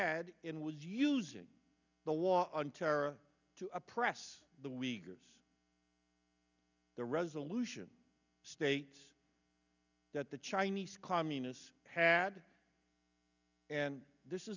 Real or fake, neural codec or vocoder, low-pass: real; none; 7.2 kHz